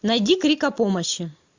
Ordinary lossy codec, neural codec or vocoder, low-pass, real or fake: MP3, 64 kbps; none; 7.2 kHz; real